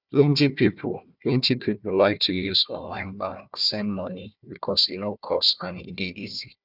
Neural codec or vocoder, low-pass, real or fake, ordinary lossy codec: codec, 16 kHz, 1 kbps, FunCodec, trained on Chinese and English, 50 frames a second; 5.4 kHz; fake; none